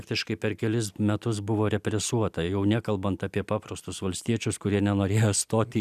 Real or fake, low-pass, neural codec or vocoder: real; 14.4 kHz; none